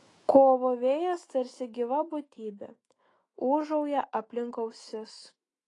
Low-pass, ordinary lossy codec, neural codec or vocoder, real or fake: 10.8 kHz; AAC, 32 kbps; autoencoder, 48 kHz, 128 numbers a frame, DAC-VAE, trained on Japanese speech; fake